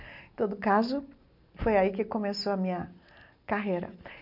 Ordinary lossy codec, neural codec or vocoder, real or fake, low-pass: none; none; real; 5.4 kHz